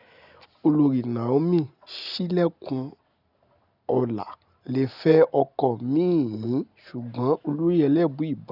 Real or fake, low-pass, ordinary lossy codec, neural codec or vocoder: real; 5.4 kHz; none; none